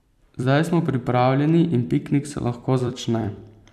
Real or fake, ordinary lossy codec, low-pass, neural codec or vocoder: fake; none; 14.4 kHz; vocoder, 44.1 kHz, 128 mel bands every 256 samples, BigVGAN v2